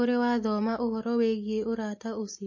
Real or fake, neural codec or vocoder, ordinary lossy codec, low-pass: real; none; MP3, 32 kbps; 7.2 kHz